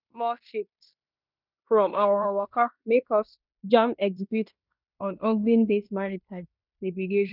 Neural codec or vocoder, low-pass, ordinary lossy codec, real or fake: codec, 16 kHz in and 24 kHz out, 0.9 kbps, LongCat-Audio-Codec, fine tuned four codebook decoder; 5.4 kHz; none; fake